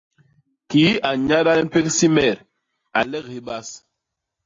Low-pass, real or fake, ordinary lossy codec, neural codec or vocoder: 7.2 kHz; real; AAC, 32 kbps; none